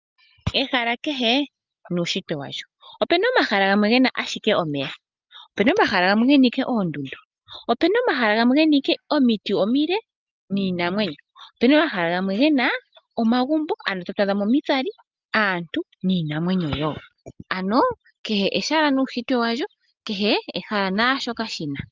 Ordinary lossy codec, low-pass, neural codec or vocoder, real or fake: Opus, 24 kbps; 7.2 kHz; none; real